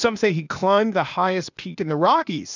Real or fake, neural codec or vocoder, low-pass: fake; codec, 16 kHz, 0.8 kbps, ZipCodec; 7.2 kHz